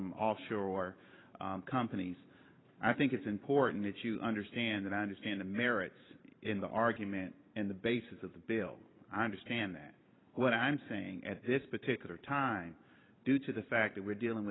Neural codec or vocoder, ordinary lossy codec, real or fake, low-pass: vocoder, 44.1 kHz, 128 mel bands every 512 samples, BigVGAN v2; AAC, 16 kbps; fake; 7.2 kHz